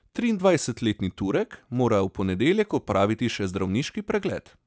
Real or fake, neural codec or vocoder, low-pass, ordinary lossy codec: real; none; none; none